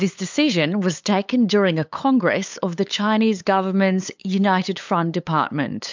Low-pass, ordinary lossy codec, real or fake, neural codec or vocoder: 7.2 kHz; MP3, 64 kbps; fake; codec, 16 kHz, 8 kbps, FunCodec, trained on LibriTTS, 25 frames a second